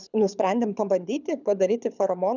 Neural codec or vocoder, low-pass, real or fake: codec, 24 kHz, 6 kbps, HILCodec; 7.2 kHz; fake